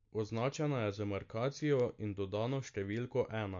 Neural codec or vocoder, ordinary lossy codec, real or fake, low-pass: none; MP3, 48 kbps; real; 7.2 kHz